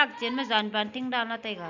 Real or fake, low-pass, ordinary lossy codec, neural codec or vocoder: real; 7.2 kHz; none; none